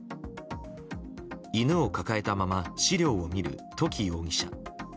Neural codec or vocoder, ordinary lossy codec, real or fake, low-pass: none; none; real; none